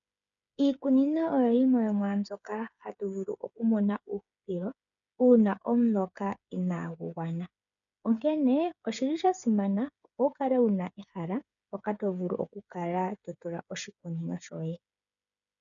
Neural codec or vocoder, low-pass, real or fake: codec, 16 kHz, 8 kbps, FreqCodec, smaller model; 7.2 kHz; fake